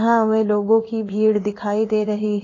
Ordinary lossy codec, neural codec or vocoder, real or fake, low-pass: AAC, 32 kbps; codec, 16 kHz in and 24 kHz out, 1 kbps, XY-Tokenizer; fake; 7.2 kHz